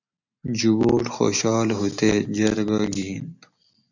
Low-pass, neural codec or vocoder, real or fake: 7.2 kHz; none; real